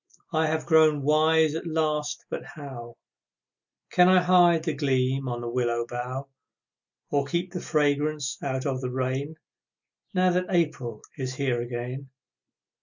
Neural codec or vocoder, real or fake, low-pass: none; real; 7.2 kHz